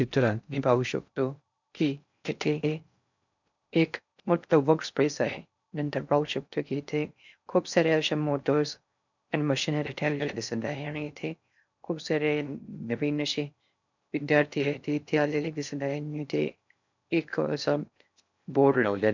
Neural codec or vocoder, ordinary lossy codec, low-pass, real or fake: codec, 16 kHz in and 24 kHz out, 0.6 kbps, FocalCodec, streaming, 2048 codes; none; 7.2 kHz; fake